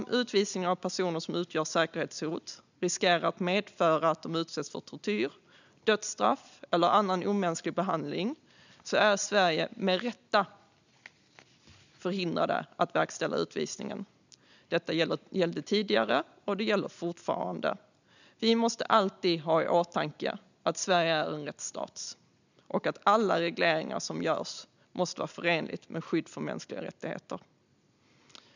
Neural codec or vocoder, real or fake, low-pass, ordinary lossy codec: none; real; 7.2 kHz; none